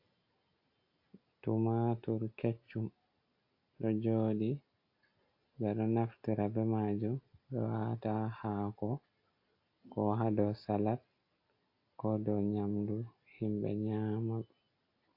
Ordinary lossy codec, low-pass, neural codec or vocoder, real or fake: AAC, 32 kbps; 5.4 kHz; none; real